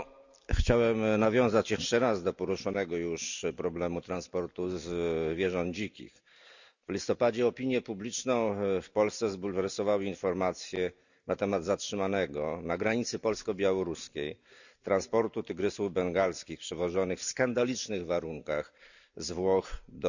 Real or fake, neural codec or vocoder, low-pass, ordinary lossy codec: real; none; 7.2 kHz; none